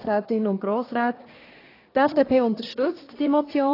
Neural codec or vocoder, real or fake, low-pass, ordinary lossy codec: codec, 24 kHz, 1 kbps, SNAC; fake; 5.4 kHz; AAC, 24 kbps